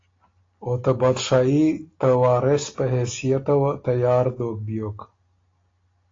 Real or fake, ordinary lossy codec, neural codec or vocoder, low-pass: real; AAC, 32 kbps; none; 7.2 kHz